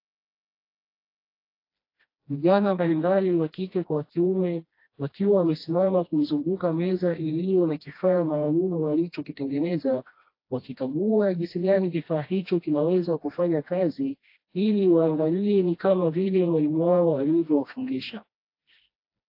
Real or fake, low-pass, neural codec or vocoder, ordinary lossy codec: fake; 5.4 kHz; codec, 16 kHz, 1 kbps, FreqCodec, smaller model; AAC, 32 kbps